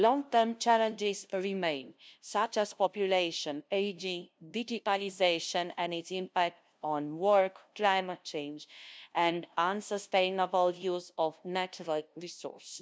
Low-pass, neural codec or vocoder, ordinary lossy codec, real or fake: none; codec, 16 kHz, 0.5 kbps, FunCodec, trained on LibriTTS, 25 frames a second; none; fake